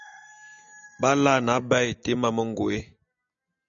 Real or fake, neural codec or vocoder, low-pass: real; none; 7.2 kHz